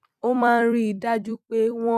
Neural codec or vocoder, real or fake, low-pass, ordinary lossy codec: vocoder, 44.1 kHz, 128 mel bands every 256 samples, BigVGAN v2; fake; 14.4 kHz; none